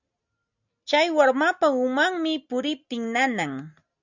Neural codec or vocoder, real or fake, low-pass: none; real; 7.2 kHz